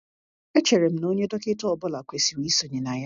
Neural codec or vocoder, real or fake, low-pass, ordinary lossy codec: none; real; 7.2 kHz; none